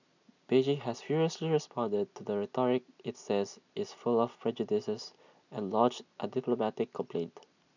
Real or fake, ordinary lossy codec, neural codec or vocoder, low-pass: real; none; none; 7.2 kHz